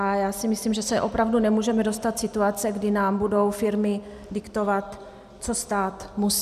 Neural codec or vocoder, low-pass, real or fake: none; 14.4 kHz; real